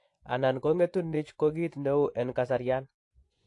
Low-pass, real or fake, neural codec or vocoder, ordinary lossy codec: 10.8 kHz; fake; vocoder, 24 kHz, 100 mel bands, Vocos; AAC, 48 kbps